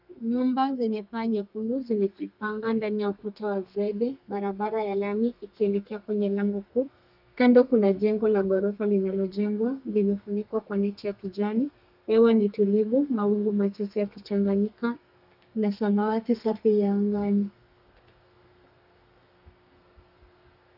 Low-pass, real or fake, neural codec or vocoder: 5.4 kHz; fake; codec, 32 kHz, 1.9 kbps, SNAC